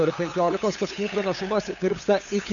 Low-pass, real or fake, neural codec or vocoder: 7.2 kHz; fake; codec, 16 kHz, 8 kbps, FunCodec, trained on LibriTTS, 25 frames a second